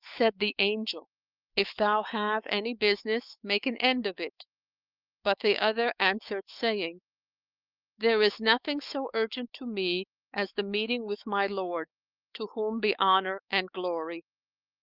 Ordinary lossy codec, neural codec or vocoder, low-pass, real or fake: Opus, 32 kbps; autoencoder, 48 kHz, 128 numbers a frame, DAC-VAE, trained on Japanese speech; 5.4 kHz; fake